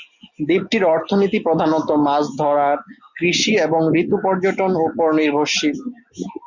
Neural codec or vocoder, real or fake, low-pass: none; real; 7.2 kHz